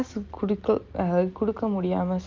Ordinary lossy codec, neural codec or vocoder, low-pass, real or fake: Opus, 32 kbps; none; 7.2 kHz; real